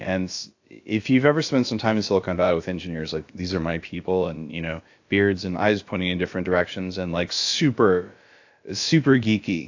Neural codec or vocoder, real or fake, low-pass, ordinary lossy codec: codec, 16 kHz, about 1 kbps, DyCAST, with the encoder's durations; fake; 7.2 kHz; AAC, 48 kbps